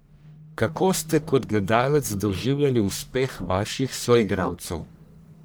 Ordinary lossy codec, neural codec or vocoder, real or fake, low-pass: none; codec, 44.1 kHz, 1.7 kbps, Pupu-Codec; fake; none